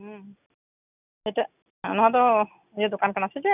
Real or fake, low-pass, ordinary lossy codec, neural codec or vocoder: real; 3.6 kHz; none; none